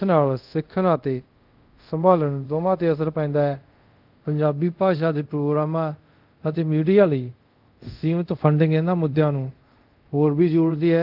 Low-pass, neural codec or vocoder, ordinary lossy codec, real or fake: 5.4 kHz; codec, 24 kHz, 0.5 kbps, DualCodec; Opus, 32 kbps; fake